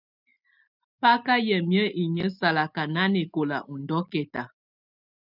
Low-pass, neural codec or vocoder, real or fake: 5.4 kHz; none; real